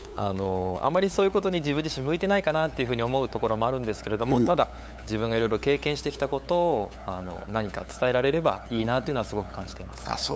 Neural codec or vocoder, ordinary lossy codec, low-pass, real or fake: codec, 16 kHz, 8 kbps, FunCodec, trained on LibriTTS, 25 frames a second; none; none; fake